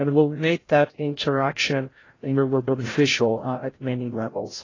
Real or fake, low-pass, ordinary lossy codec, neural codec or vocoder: fake; 7.2 kHz; AAC, 32 kbps; codec, 16 kHz, 0.5 kbps, FreqCodec, larger model